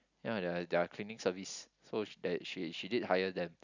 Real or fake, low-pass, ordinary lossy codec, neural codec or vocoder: real; 7.2 kHz; none; none